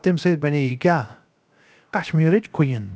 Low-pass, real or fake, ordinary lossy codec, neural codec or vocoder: none; fake; none; codec, 16 kHz, 0.7 kbps, FocalCodec